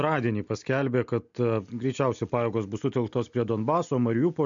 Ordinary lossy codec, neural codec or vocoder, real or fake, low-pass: MP3, 64 kbps; none; real; 7.2 kHz